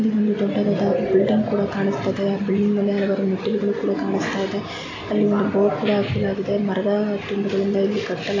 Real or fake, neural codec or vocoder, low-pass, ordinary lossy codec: real; none; 7.2 kHz; AAC, 32 kbps